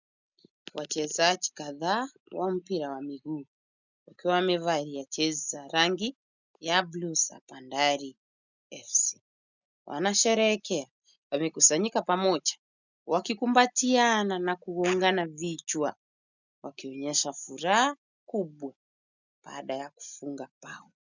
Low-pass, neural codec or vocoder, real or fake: 7.2 kHz; none; real